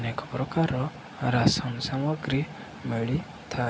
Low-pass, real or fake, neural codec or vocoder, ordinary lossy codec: none; real; none; none